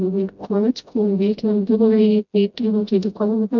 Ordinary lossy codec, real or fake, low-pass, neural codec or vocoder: none; fake; 7.2 kHz; codec, 16 kHz, 0.5 kbps, FreqCodec, smaller model